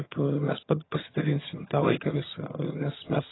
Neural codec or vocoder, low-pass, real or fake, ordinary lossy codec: vocoder, 22.05 kHz, 80 mel bands, HiFi-GAN; 7.2 kHz; fake; AAC, 16 kbps